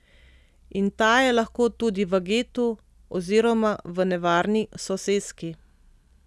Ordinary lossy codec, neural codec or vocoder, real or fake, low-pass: none; none; real; none